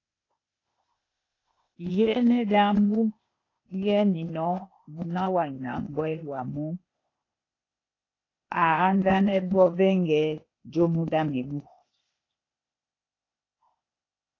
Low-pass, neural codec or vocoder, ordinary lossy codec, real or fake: 7.2 kHz; codec, 16 kHz, 0.8 kbps, ZipCodec; AAC, 32 kbps; fake